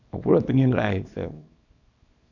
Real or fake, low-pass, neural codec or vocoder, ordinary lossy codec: fake; 7.2 kHz; codec, 24 kHz, 0.9 kbps, WavTokenizer, small release; none